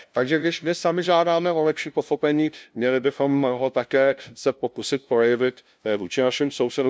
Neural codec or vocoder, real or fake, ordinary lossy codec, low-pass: codec, 16 kHz, 0.5 kbps, FunCodec, trained on LibriTTS, 25 frames a second; fake; none; none